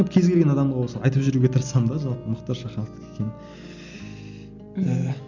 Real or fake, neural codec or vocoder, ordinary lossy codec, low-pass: real; none; none; 7.2 kHz